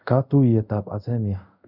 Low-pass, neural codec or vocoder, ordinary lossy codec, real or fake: 5.4 kHz; codec, 24 kHz, 0.5 kbps, DualCodec; none; fake